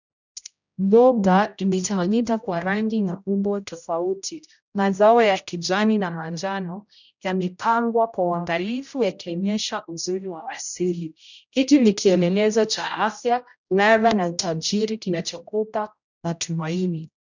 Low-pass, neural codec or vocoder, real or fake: 7.2 kHz; codec, 16 kHz, 0.5 kbps, X-Codec, HuBERT features, trained on general audio; fake